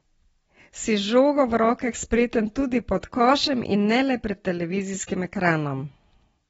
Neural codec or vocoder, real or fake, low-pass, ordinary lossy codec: none; real; 19.8 kHz; AAC, 24 kbps